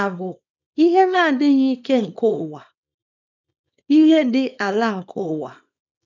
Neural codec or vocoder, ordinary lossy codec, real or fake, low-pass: codec, 24 kHz, 0.9 kbps, WavTokenizer, small release; none; fake; 7.2 kHz